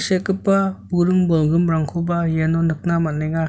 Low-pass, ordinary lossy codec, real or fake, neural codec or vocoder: none; none; real; none